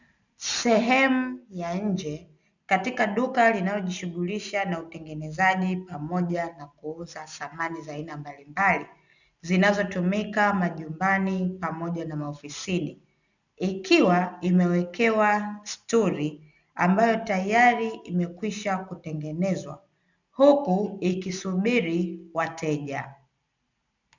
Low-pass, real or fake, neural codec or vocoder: 7.2 kHz; real; none